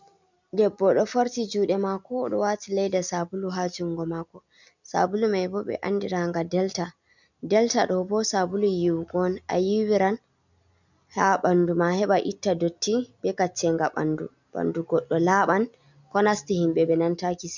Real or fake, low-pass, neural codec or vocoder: real; 7.2 kHz; none